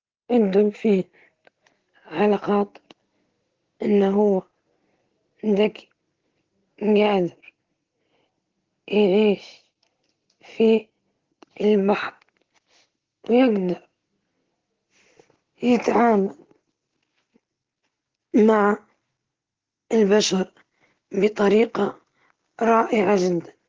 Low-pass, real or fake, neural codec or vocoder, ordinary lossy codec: 7.2 kHz; real; none; Opus, 16 kbps